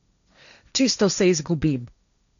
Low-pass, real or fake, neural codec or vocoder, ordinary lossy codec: 7.2 kHz; fake; codec, 16 kHz, 1.1 kbps, Voila-Tokenizer; none